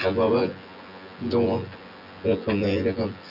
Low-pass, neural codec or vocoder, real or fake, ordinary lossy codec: 5.4 kHz; vocoder, 24 kHz, 100 mel bands, Vocos; fake; none